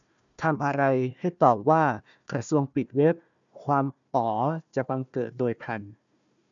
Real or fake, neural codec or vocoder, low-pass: fake; codec, 16 kHz, 1 kbps, FunCodec, trained on Chinese and English, 50 frames a second; 7.2 kHz